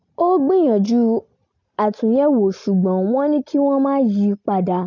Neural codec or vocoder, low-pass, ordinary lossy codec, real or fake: none; 7.2 kHz; none; real